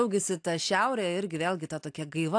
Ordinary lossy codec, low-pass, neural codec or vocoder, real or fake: AAC, 64 kbps; 9.9 kHz; none; real